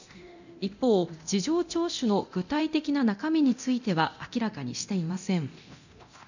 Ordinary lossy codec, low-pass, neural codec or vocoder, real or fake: none; 7.2 kHz; codec, 24 kHz, 0.9 kbps, DualCodec; fake